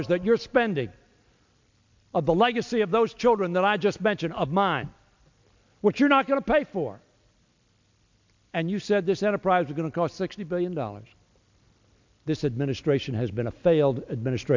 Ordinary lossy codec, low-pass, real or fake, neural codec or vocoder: MP3, 64 kbps; 7.2 kHz; real; none